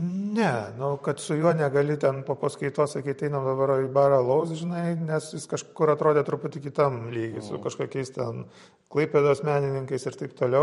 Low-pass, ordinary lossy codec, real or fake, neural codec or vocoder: 19.8 kHz; MP3, 48 kbps; fake; vocoder, 44.1 kHz, 128 mel bands every 512 samples, BigVGAN v2